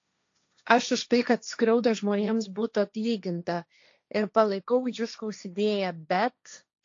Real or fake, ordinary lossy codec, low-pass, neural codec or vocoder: fake; AAC, 48 kbps; 7.2 kHz; codec, 16 kHz, 1.1 kbps, Voila-Tokenizer